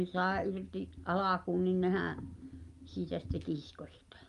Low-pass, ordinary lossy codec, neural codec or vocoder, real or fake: 10.8 kHz; Opus, 32 kbps; none; real